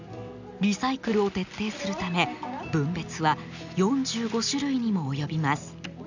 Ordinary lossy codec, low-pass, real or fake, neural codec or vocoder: none; 7.2 kHz; real; none